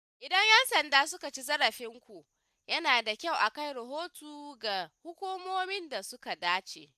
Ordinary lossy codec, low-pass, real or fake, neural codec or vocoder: none; 14.4 kHz; real; none